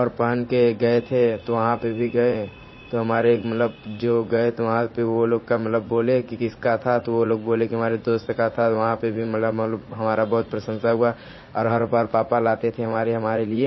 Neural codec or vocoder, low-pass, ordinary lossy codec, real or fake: vocoder, 44.1 kHz, 80 mel bands, Vocos; 7.2 kHz; MP3, 24 kbps; fake